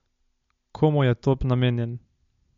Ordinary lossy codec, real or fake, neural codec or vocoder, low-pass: MP3, 48 kbps; real; none; 7.2 kHz